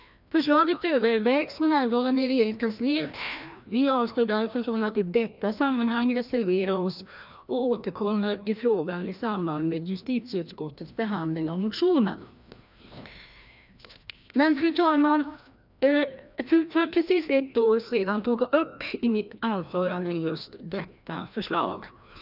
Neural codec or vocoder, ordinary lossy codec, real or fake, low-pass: codec, 16 kHz, 1 kbps, FreqCodec, larger model; none; fake; 5.4 kHz